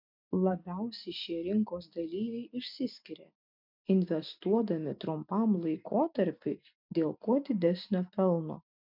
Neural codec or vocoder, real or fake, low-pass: none; real; 5.4 kHz